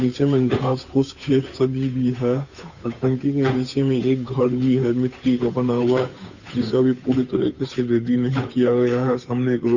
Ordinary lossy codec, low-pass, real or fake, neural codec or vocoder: none; 7.2 kHz; fake; codec, 16 kHz, 8 kbps, FunCodec, trained on Chinese and English, 25 frames a second